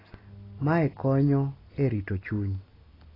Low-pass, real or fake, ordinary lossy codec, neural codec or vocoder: 5.4 kHz; real; AAC, 24 kbps; none